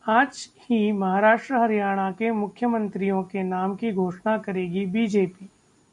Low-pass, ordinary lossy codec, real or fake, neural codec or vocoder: 10.8 kHz; MP3, 96 kbps; real; none